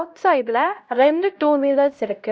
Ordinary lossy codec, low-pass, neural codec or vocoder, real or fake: none; none; codec, 16 kHz, 0.5 kbps, X-Codec, HuBERT features, trained on LibriSpeech; fake